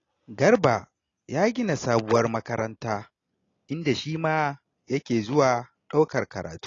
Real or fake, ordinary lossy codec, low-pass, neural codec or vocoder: real; AAC, 32 kbps; 7.2 kHz; none